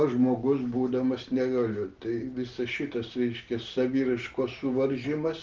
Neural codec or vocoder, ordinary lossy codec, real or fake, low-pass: none; Opus, 16 kbps; real; 7.2 kHz